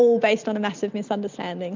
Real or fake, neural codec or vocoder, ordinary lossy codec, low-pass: real; none; MP3, 64 kbps; 7.2 kHz